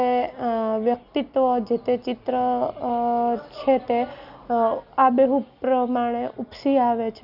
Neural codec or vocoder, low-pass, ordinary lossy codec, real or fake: none; 5.4 kHz; none; real